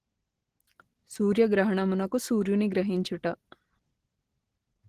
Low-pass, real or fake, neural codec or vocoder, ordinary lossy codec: 14.4 kHz; real; none; Opus, 16 kbps